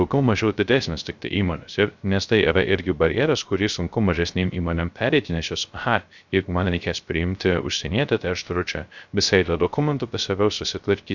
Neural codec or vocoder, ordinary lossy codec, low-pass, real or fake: codec, 16 kHz, 0.3 kbps, FocalCodec; Opus, 64 kbps; 7.2 kHz; fake